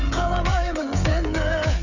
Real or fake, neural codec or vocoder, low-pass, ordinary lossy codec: fake; codec, 16 kHz, 16 kbps, FreqCodec, smaller model; 7.2 kHz; none